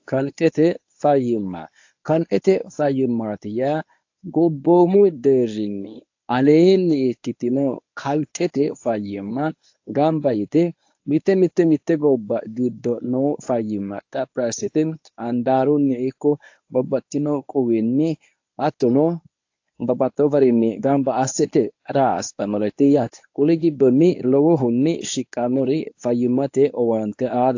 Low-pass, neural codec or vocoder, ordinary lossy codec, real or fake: 7.2 kHz; codec, 24 kHz, 0.9 kbps, WavTokenizer, medium speech release version 1; AAC, 48 kbps; fake